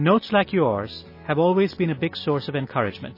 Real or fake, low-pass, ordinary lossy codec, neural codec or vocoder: real; 5.4 kHz; MP3, 24 kbps; none